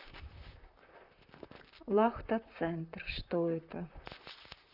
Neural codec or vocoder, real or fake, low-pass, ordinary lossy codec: vocoder, 44.1 kHz, 128 mel bands, Pupu-Vocoder; fake; 5.4 kHz; none